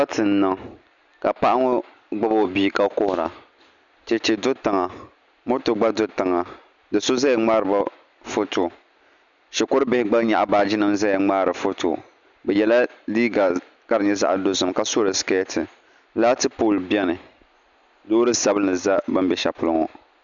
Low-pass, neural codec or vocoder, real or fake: 7.2 kHz; none; real